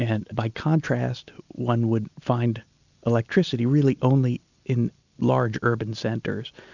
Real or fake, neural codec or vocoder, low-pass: real; none; 7.2 kHz